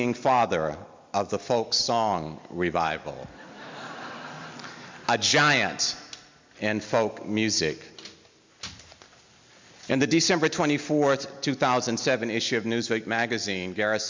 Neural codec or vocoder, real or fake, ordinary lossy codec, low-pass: none; real; MP3, 64 kbps; 7.2 kHz